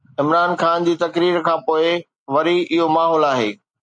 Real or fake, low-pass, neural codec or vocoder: real; 9.9 kHz; none